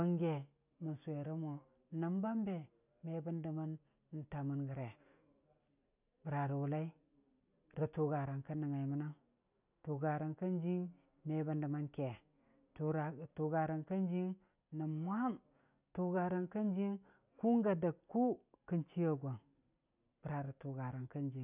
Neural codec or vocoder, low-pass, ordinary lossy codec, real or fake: none; 3.6 kHz; none; real